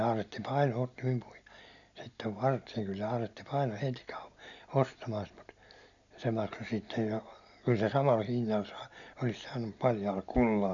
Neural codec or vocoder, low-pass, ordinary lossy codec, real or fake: none; 7.2 kHz; none; real